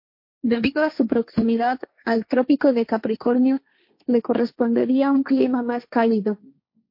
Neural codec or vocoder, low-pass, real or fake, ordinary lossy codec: codec, 16 kHz, 1.1 kbps, Voila-Tokenizer; 5.4 kHz; fake; MP3, 32 kbps